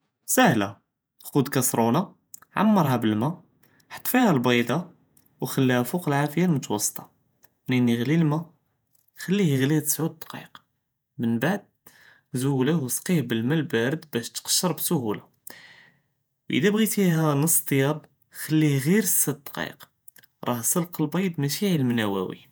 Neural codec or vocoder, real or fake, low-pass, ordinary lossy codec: vocoder, 48 kHz, 128 mel bands, Vocos; fake; none; none